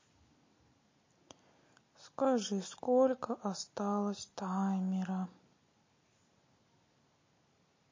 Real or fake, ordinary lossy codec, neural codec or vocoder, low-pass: real; MP3, 32 kbps; none; 7.2 kHz